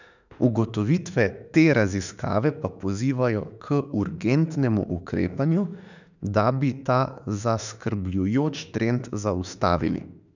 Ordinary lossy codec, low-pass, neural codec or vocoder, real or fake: none; 7.2 kHz; autoencoder, 48 kHz, 32 numbers a frame, DAC-VAE, trained on Japanese speech; fake